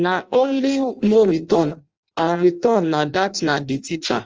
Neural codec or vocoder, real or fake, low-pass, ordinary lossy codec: codec, 16 kHz in and 24 kHz out, 0.6 kbps, FireRedTTS-2 codec; fake; 7.2 kHz; Opus, 24 kbps